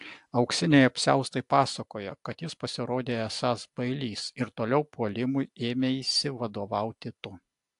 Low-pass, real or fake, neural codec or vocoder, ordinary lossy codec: 10.8 kHz; real; none; AAC, 64 kbps